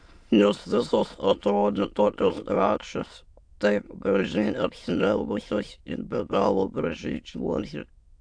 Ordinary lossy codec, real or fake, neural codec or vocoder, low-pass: AAC, 64 kbps; fake; autoencoder, 22.05 kHz, a latent of 192 numbers a frame, VITS, trained on many speakers; 9.9 kHz